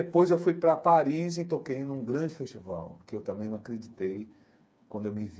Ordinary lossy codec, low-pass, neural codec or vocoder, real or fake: none; none; codec, 16 kHz, 4 kbps, FreqCodec, smaller model; fake